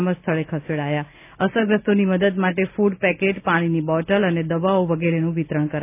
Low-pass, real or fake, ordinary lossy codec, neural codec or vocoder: 3.6 kHz; real; none; none